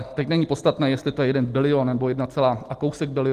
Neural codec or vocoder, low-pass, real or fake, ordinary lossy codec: none; 14.4 kHz; real; Opus, 24 kbps